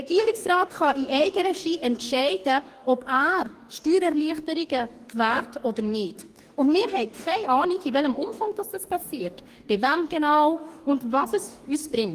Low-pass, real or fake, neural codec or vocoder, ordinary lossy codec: 14.4 kHz; fake; codec, 44.1 kHz, 2.6 kbps, DAC; Opus, 24 kbps